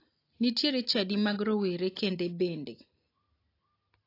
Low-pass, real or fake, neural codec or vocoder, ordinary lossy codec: 5.4 kHz; real; none; AAC, 32 kbps